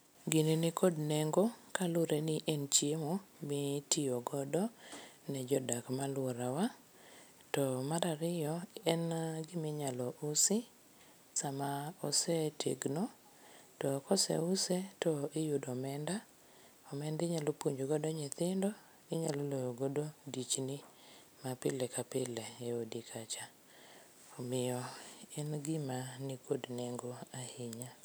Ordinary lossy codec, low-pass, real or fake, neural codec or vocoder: none; none; real; none